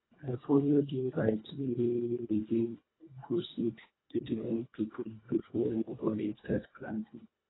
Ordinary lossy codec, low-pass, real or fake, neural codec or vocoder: AAC, 16 kbps; 7.2 kHz; fake; codec, 24 kHz, 1.5 kbps, HILCodec